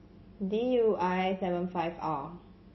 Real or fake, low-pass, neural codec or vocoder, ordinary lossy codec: real; 7.2 kHz; none; MP3, 24 kbps